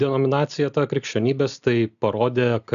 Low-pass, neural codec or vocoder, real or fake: 7.2 kHz; none; real